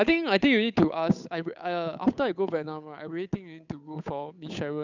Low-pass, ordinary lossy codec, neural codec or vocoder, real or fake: 7.2 kHz; none; codec, 16 kHz, 8 kbps, FunCodec, trained on Chinese and English, 25 frames a second; fake